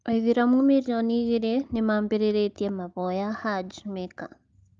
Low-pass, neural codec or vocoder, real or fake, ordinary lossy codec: 7.2 kHz; none; real; Opus, 32 kbps